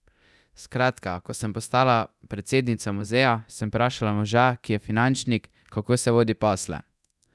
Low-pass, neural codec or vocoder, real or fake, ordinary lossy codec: none; codec, 24 kHz, 0.9 kbps, DualCodec; fake; none